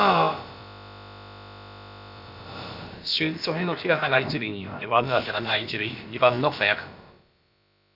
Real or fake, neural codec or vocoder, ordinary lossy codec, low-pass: fake; codec, 16 kHz, about 1 kbps, DyCAST, with the encoder's durations; none; 5.4 kHz